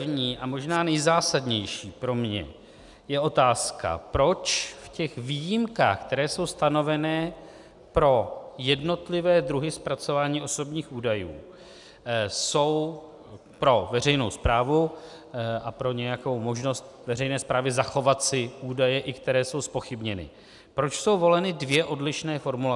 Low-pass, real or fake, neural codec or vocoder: 10.8 kHz; real; none